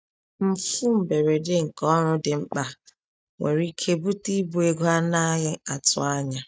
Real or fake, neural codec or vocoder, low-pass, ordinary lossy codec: real; none; none; none